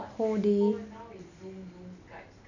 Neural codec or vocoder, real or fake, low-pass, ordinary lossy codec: none; real; 7.2 kHz; none